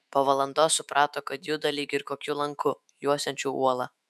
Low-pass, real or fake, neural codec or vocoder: 14.4 kHz; fake; autoencoder, 48 kHz, 128 numbers a frame, DAC-VAE, trained on Japanese speech